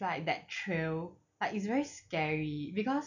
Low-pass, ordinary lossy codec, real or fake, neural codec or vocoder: 7.2 kHz; none; real; none